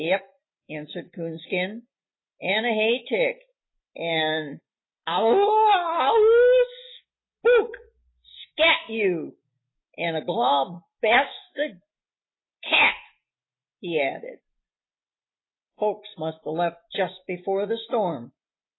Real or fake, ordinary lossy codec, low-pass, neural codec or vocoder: real; AAC, 16 kbps; 7.2 kHz; none